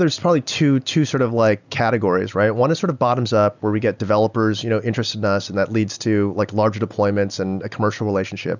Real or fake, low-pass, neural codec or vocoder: real; 7.2 kHz; none